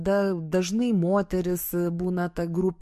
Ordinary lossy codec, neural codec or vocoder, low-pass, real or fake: MP3, 64 kbps; codec, 44.1 kHz, 7.8 kbps, DAC; 14.4 kHz; fake